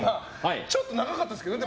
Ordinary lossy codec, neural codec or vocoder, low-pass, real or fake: none; none; none; real